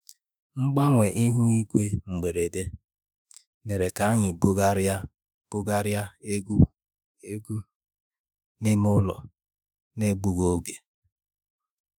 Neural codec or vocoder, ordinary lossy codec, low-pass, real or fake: autoencoder, 48 kHz, 32 numbers a frame, DAC-VAE, trained on Japanese speech; none; none; fake